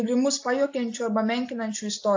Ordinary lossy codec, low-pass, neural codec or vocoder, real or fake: AAC, 48 kbps; 7.2 kHz; none; real